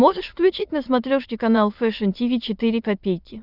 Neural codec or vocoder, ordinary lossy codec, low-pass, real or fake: autoencoder, 22.05 kHz, a latent of 192 numbers a frame, VITS, trained on many speakers; Opus, 64 kbps; 5.4 kHz; fake